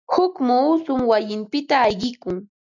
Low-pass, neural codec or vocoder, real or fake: 7.2 kHz; none; real